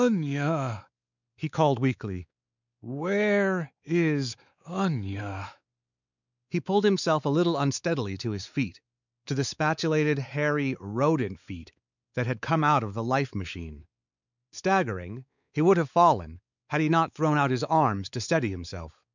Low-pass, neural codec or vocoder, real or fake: 7.2 kHz; codec, 16 kHz, 4 kbps, X-Codec, WavLM features, trained on Multilingual LibriSpeech; fake